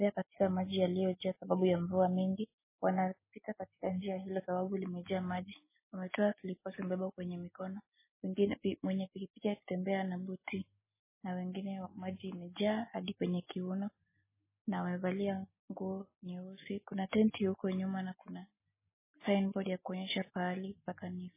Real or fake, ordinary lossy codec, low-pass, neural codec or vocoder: real; MP3, 16 kbps; 3.6 kHz; none